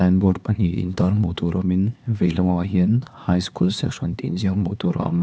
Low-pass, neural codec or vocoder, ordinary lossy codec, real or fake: none; codec, 16 kHz, 2 kbps, X-Codec, HuBERT features, trained on LibriSpeech; none; fake